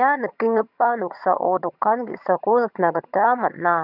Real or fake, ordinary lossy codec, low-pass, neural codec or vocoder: fake; none; 5.4 kHz; vocoder, 22.05 kHz, 80 mel bands, HiFi-GAN